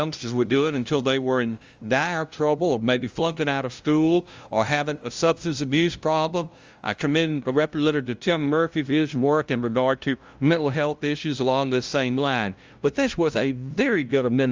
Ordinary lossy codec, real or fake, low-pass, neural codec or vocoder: Opus, 32 kbps; fake; 7.2 kHz; codec, 16 kHz, 0.5 kbps, FunCodec, trained on LibriTTS, 25 frames a second